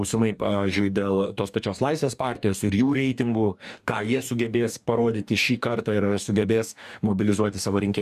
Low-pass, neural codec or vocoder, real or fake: 14.4 kHz; codec, 44.1 kHz, 2.6 kbps, DAC; fake